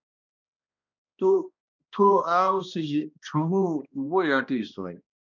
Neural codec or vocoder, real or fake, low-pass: codec, 16 kHz, 1 kbps, X-Codec, HuBERT features, trained on balanced general audio; fake; 7.2 kHz